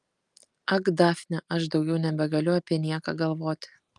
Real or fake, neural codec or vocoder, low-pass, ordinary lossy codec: real; none; 10.8 kHz; Opus, 32 kbps